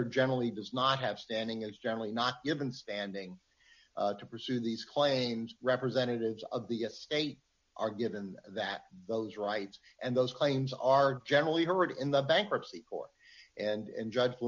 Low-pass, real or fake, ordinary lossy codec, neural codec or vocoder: 7.2 kHz; real; MP3, 64 kbps; none